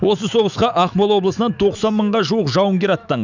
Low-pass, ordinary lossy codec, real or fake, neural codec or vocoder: 7.2 kHz; none; fake; autoencoder, 48 kHz, 128 numbers a frame, DAC-VAE, trained on Japanese speech